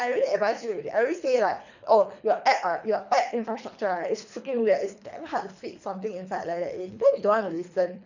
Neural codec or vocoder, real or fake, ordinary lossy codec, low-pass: codec, 24 kHz, 3 kbps, HILCodec; fake; none; 7.2 kHz